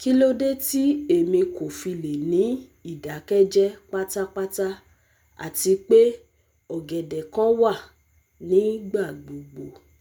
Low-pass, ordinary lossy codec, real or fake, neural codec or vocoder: none; none; real; none